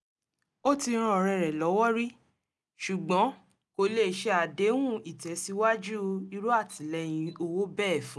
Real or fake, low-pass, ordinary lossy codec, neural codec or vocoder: real; none; none; none